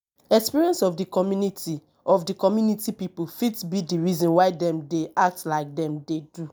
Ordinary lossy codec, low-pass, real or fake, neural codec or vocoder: none; none; real; none